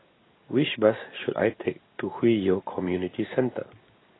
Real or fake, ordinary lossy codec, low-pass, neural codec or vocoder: real; AAC, 16 kbps; 7.2 kHz; none